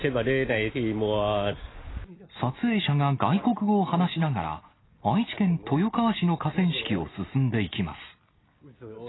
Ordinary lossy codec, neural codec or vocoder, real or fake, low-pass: AAC, 16 kbps; none; real; 7.2 kHz